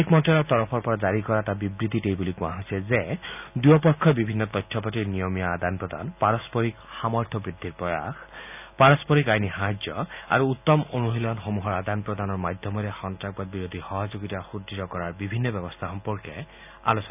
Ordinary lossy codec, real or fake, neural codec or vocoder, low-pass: none; real; none; 3.6 kHz